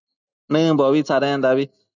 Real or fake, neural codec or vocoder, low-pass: real; none; 7.2 kHz